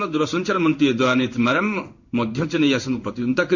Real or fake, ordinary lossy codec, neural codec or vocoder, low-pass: fake; none; codec, 16 kHz in and 24 kHz out, 1 kbps, XY-Tokenizer; 7.2 kHz